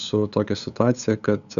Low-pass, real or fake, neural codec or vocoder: 7.2 kHz; fake; codec, 16 kHz, 8 kbps, FunCodec, trained on LibriTTS, 25 frames a second